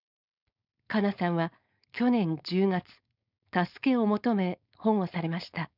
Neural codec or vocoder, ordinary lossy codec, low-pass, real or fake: codec, 16 kHz, 4.8 kbps, FACodec; none; 5.4 kHz; fake